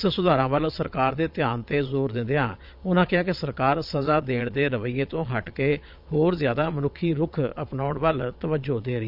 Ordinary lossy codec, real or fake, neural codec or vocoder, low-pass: none; fake; vocoder, 22.05 kHz, 80 mel bands, Vocos; 5.4 kHz